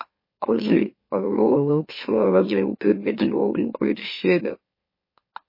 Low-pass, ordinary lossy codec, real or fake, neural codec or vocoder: 5.4 kHz; MP3, 24 kbps; fake; autoencoder, 44.1 kHz, a latent of 192 numbers a frame, MeloTTS